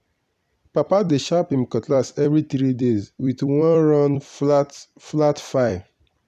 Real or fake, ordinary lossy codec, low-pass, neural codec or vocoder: fake; none; 14.4 kHz; vocoder, 44.1 kHz, 128 mel bands every 256 samples, BigVGAN v2